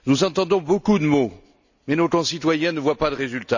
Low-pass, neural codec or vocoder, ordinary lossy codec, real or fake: 7.2 kHz; none; none; real